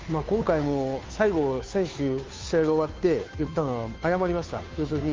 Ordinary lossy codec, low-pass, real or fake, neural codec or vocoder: Opus, 24 kbps; 7.2 kHz; fake; codec, 16 kHz, 2 kbps, FunCodec, trained on Chinese and English, 25 frames a second